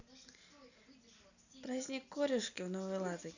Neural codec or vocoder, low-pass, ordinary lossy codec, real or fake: none; 7.2 kHz; none; real